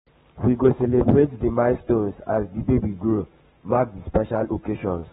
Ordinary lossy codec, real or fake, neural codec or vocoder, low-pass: AAC, 16 kbps; fake; codec, 44.1 kHz, 7.8 kbps, Pupu-Codec; 19.8 kHz